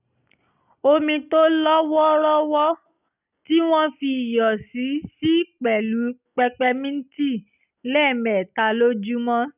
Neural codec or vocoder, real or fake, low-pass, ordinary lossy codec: none; real; 3.6 kHz; none